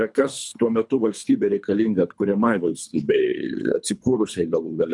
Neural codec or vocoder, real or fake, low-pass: codec, 24 kHz, 3 kbps, HILCodec; fake; 10.8 kHz